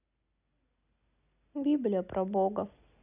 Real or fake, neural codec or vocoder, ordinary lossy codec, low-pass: real; none; none; 3.6 kHz